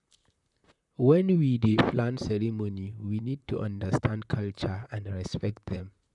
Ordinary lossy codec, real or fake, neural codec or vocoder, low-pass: none; fake; vocoder, 44.1 kHz, 128 mel bands, Pupu-Vocoder; 10.8 kHz